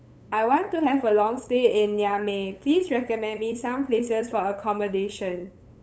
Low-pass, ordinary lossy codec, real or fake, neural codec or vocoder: none; none; fake; codec, 16 kHz, 8 kbps, FunCodec, trained on LibriTTS, 25 frames a second